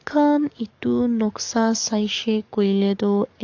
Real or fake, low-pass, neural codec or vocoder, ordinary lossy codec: fake; 7.2 kHz; codec, 44.1 kHz, 7.8 kbps, DAC; AAC, 48 kbps